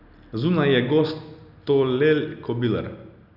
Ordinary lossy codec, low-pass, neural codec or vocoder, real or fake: none; 5.4 kHz; none; real